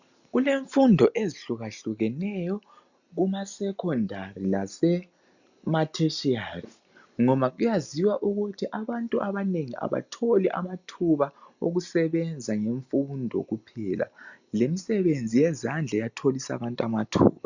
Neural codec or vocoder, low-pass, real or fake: none; 7.2 kHz; real